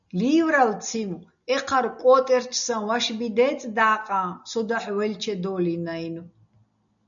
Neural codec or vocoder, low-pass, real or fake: none; 7.2 kHz; real